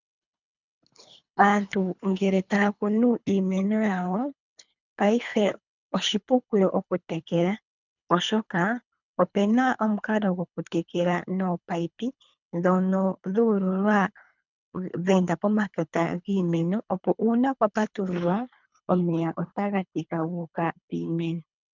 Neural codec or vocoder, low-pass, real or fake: codec, 24 kHz, 3 kbps, HILCodec; 7.2 kHz; fake